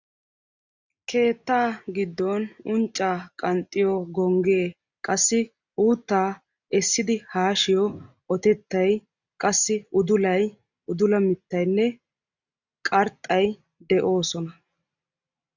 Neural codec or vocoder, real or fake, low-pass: none; real; 7.2 kHz